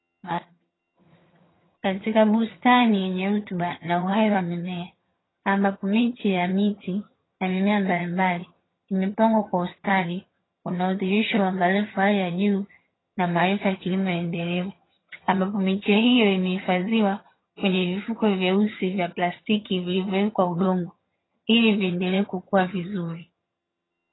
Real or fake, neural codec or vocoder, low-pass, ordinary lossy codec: fake; vocoder, 22.05 kHz, 80 mel bands, HiFi-GAN; 7.2 kHz; AAC, 16 kbps